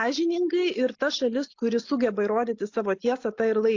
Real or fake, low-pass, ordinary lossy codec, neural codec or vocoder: real; 7.2 kHz; AAC, 48 kbps; none